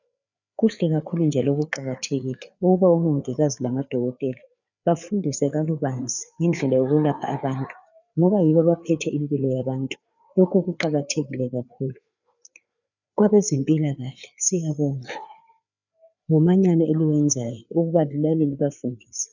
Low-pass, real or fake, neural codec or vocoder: 7.2 kHz; fake; codec, 16 kHz, 4 kbps, FreqCodec, larger model